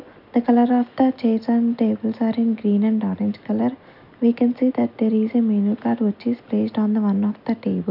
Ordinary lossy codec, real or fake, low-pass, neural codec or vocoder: none; real; 5.4 kHz; none